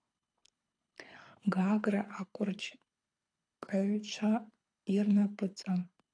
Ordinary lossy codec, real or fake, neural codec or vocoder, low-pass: AAC, 48 kbps; fake; codec, 24 kHz, 6 kbps, HILCodec; 9.9 kHz